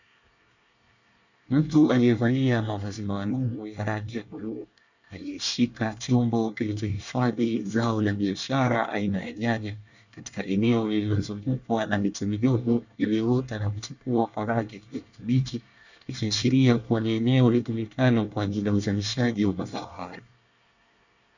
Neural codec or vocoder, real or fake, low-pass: codec, 24 kHz, 1 kbps, SNAC; fake; 7.2 kHz